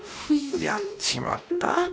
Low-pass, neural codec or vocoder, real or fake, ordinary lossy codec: none; codec, 16 kHz, 1 kbps, X-Codec, WavLM features, trained on Multilingual LibriSpeech; fake; none